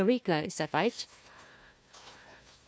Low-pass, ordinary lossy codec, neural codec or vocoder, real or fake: none; none; codec, 16 kHz, 1 kbps, FunCodec, trained on Chinese and English, 50 frames a second; fake